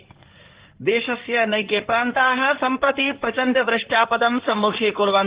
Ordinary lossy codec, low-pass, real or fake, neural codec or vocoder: Opus, 16 kbps; 3.6 kHz; fake; codec, 16 kHz in and 24 kHz out, 2.2 kbps, FireRedTTS-2 codec